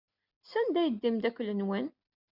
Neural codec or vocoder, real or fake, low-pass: none; real; 5.4 kHz